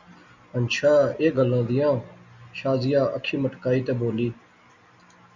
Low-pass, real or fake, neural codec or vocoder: 7.2 kHz; real; none